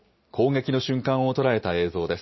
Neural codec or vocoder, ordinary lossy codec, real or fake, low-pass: none; MP3, 24 kbps; real; 7.2 kHz